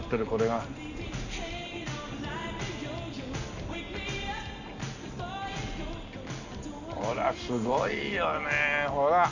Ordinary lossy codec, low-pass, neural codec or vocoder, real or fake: none; 7.2 kHz; none; real